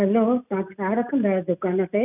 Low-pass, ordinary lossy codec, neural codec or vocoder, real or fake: 3.6 kHz; none; none; real